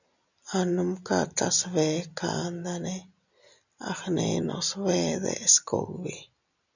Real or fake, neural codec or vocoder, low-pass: real; none; 7.2 kHz